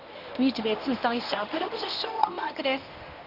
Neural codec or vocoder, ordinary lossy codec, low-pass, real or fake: codec, 24 kHz, 0.9 kbps, WavTokenizer, medium speech release version 1; none; 5.4 kHz; fake